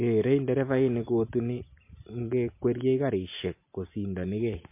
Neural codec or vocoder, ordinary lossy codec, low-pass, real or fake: none; MP3, 24 kbps; 3.6 kHz; real